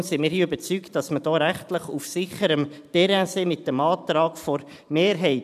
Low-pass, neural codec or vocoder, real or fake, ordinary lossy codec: 14.4 kHz; vocoder, 44.1 kHz, 128 mel bands every 512 samples, BigVGAN v2; fake; none